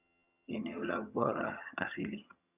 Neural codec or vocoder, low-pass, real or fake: vocoder, 22.05 kHz, 80 mel bands, HiFi-GAN; 3.6 kHz; fake